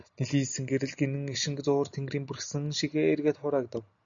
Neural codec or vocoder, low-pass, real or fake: none; 7.2 kHz; real